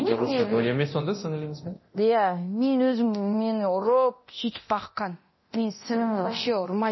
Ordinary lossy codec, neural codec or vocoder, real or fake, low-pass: MP3, 24 kbps; codec, 24 kHz, 0.9 kbps, DualCodec; fake; 7.2 kHz